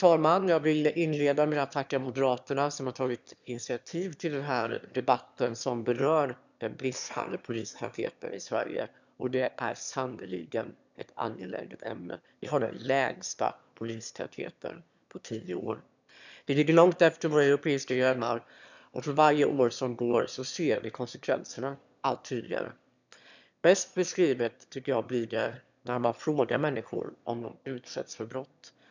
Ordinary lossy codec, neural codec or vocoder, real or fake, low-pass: none; autoencoder, 22.05 kHz, a latent of 192 numbers a frame, VITS, trained on one speaker; fake; 7.2 kHz